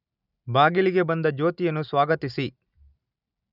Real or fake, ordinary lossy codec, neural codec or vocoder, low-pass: real; none; none; 5.4 kHz